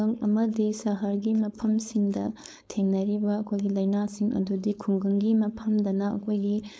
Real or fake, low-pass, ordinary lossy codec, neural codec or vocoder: fake; none; none; codec, 16 kHz, 4.8 kbps, FACodec